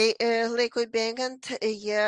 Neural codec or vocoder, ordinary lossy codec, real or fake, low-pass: none; Opus, 32 kbps; real; 10.8 kHz